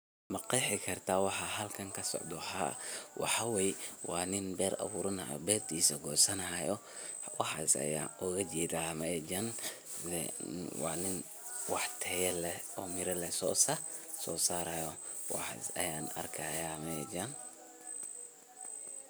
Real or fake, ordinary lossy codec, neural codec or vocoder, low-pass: real; none; none; none